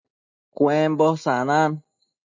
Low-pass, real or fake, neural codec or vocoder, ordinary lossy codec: 7.2 kHz; real; none; MP3, 48 kbps